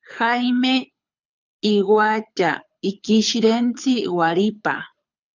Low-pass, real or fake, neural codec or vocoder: 7.2 kHz; fake; codec, 24 kHz, 6 kbps, HILCodec